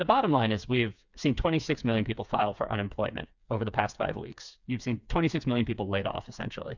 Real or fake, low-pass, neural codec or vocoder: fake; 7.2 kHz; codec, 16 kHz, 4 kbps, FreqCodec, smaller model